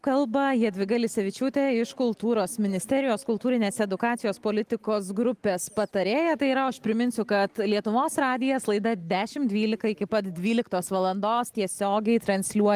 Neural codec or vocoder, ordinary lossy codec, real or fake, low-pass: none; Opus, 24 kbps; real; 14.4 kHz